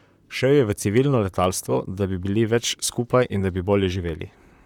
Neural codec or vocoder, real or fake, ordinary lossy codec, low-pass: vocoder, 44.1 kHz, 128 mel bands, Pupu-Vocoder; fake; none; 19.8 kHz